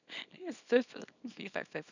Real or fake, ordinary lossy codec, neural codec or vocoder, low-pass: fake; none; codec, 24 kHz, 0.9 kbps, WavTokenizer, small release; 7.2 kHz